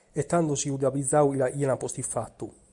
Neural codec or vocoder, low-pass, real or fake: none; 10.8 kHz; real